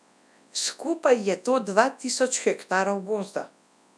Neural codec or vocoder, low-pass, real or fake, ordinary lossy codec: codec, 24 kHz, 0.9 kbps, WavTokenizer, large speech release; none; fake; none